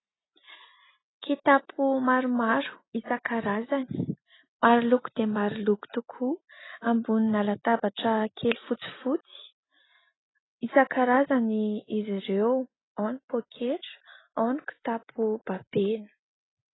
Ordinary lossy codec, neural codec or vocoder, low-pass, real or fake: AAC, 16 kbps; none; 7.2 kHz; real